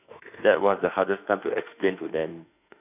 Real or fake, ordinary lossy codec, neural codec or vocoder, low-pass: fake; none; autoencoder, 48 kHz, 32 numbers a frame, DAC-VAE, trained on Japanese speech; 3.6 kHz